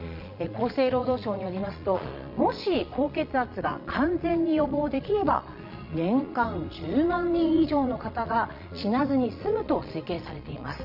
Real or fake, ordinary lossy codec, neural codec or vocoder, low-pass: fake; none; vocoder, 22.05 kHz, 80 mel bands, Vocos; 5.4 kHz